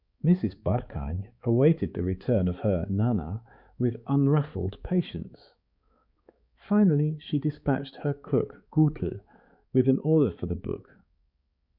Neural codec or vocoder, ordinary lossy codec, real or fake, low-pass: codec, 16 kHz, 4 kbps, X-Codec, HuBERT features, trained on balanced general audio; Opus, 64 kbps; fake; 5.4 kHz